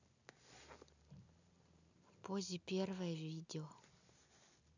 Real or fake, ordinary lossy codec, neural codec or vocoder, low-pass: real; none; none; 7.2 kHz